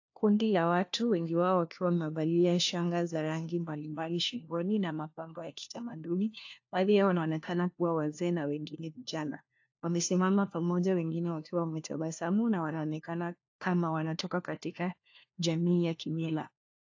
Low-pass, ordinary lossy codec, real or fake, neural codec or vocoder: 7.2 kHz; AAC, 48 kbps; fake; codec, 16 kHz, 1 kbps, FunCodec, trained on LibriTTS, 50 frames a second